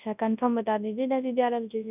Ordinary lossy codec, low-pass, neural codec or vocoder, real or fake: none; 3.6 kHz; codec, 24 kHz, 0.9 kbps, WavTokenizer, large speech release; fake